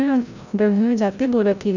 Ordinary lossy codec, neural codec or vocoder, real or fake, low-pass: none; codec, 16 kHz, 0.5 kbps, FreqCodec, larger model; fake; 7.2 kHz